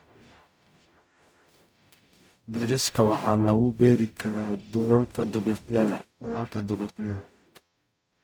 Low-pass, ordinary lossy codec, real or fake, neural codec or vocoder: none; none; fake; codec, 44.1 kHz, 0.9 kbps, DAC